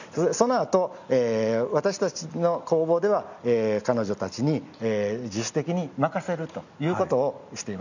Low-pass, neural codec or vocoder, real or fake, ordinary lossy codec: 7.2 kHz; none; real; none